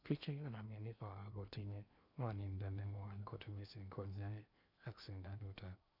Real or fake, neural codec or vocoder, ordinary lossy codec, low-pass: fake; codec, 16 kHz in and 24 kHz out, 0.8 kbps, FocalCodec, streaming, 65536 codes; none; 5.4 kHz